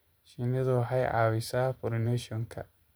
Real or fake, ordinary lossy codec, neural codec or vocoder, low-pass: fake; none; vocoder, 44.1 kHz, 128 mel bands every 512 samples, BigVGAN v2; none